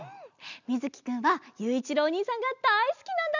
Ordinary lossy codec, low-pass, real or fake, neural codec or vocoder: none; 7.2 kHz; real; none